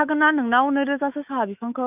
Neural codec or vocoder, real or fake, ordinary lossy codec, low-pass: autoencoder, 48 kHz, 128 numbers a frame, DAC-VAE, trained on Japanese speech; fake; none; 3.6 kHz